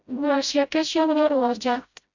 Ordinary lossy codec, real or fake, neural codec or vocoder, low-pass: none; fake; codec, 16 kHz, 0.5 kbps, FreqCodec, smaller model; 7.2 kHz